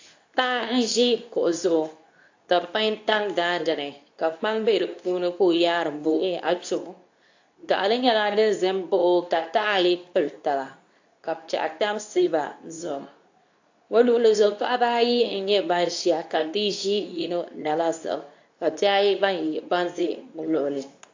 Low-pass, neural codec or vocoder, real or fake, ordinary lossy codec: 7.2 kHz; codec, 24 kHz, 0.9 kbps, WavTokenizer, medium speech release version 2; fake; AAC, 48 kbps